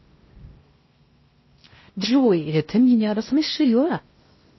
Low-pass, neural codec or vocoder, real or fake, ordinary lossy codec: 7.2 kHz; codec, 16 kHz in and 24 kHz out, 0.6 kbps, FocalCodec, streaming, 4096 codes; fake; MP3, 24 kbps